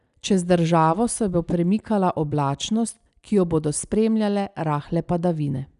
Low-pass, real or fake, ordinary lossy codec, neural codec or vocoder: 10.8 kHz; real; none; none